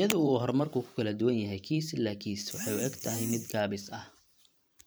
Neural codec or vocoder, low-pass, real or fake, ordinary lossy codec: vocoder, 44.1 kHz, 128 mel bands every 512 samples, BigVGAN v2; none; fake; none